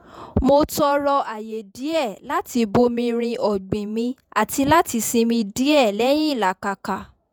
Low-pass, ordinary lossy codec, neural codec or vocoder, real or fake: none; none; vocoder, 48 kHz, 128 mel bands, Vocos; fake